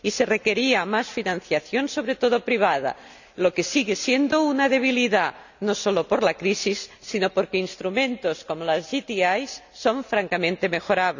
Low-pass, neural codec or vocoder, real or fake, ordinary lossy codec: 7.2 kHz; none; real; none